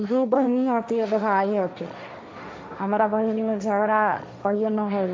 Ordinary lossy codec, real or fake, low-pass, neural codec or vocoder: none; fake; none; codec, 16 kHz, 1.1 kbps, Voila-Tokenizer